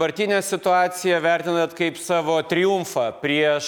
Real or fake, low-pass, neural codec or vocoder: real; 19.8 kHz; none